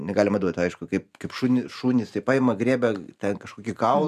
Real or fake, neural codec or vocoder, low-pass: fake; vocoder, 44.1 kHz, 128 mel bands every 512 samples, BigVGAN v2; 14.4 kHz